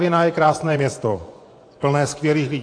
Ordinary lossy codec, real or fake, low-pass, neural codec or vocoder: AAC, 48 kbps; fake; 9.9 kHz; vocoder, 24 kHz, 100 mel bands, Vocos